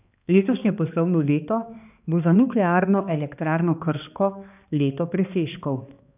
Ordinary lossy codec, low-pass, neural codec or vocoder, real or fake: none; 3.6 kHz; codec, 16 kHz, 2 kbps, X-Codec, HuBERT features, trained on balanced general audio; fake